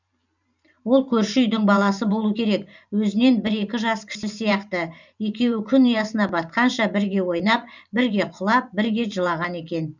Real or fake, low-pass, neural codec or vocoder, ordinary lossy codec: fake; 7.2 kHz; vocoder, 44.1 kHz, 128 mel bands every 512 samples, BigVGAN v2; none